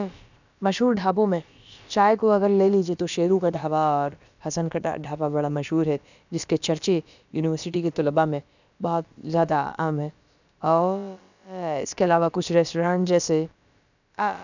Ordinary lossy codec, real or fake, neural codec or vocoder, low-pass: none; fake; codec, 16 kHz, about 1 kbps, DyCAST, with the encoder's durations; 7.2 kHz